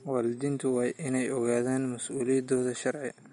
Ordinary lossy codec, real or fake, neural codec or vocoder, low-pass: MP3, 48 kbps; real; none; 10.8 kHz